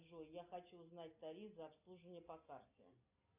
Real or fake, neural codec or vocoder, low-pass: real; none; 3.6 kHz